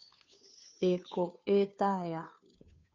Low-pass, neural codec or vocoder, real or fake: 7.2 kHz; codec, 16 kHz, 2 kbps, FunCodec, trained on Chinese and English, 25 frames a second; fake